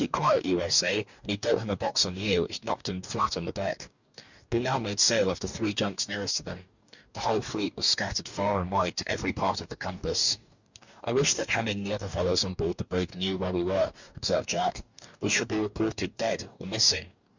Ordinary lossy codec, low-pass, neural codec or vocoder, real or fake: Opus, 64 kbps; 7.2 kHz; codec, 44.1 kHz, 2.6 kbps, DAC; fake